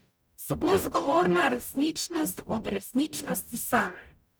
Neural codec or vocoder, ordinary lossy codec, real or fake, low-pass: codec, 44.1 kHz, 0.9 kbps, DAC; none; fake; none